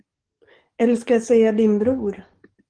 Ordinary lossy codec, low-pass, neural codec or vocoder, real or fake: Opus, 16 kbps; 9.9 kHz; codec, 44.1 kHz, 7.8 kbps, DAC; fake